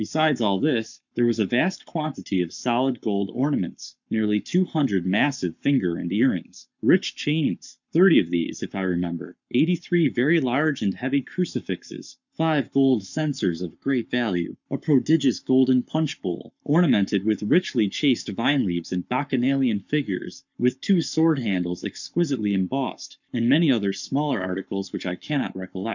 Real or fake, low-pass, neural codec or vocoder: fake; 7.2 kHz; codec, 44.1 kHz, 7.8 kbps, Pupu-Codec